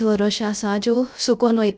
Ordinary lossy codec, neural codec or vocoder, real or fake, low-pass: none; codec, 16 kHz, 0.3 kbps, FocalCodec; fake; none